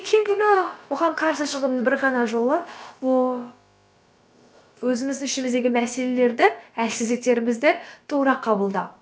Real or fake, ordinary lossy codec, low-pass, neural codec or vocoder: fake; none; none; codec, 16 kHz, about 1 kbps, DyCAST, with the encoder's durations